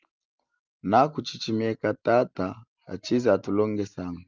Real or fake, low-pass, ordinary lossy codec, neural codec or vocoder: real; 7.2 kHz; Opus, 24 kbps; none